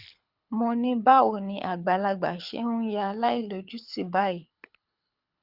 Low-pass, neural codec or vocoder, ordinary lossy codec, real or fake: 5.4 kHz; codec, 24 kHz, 6 kbps, HILCodec; Opus, 64 kbps; fake